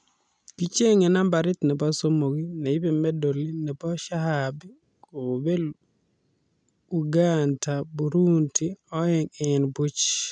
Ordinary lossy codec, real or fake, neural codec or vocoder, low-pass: none; real; none; 9.9 kHz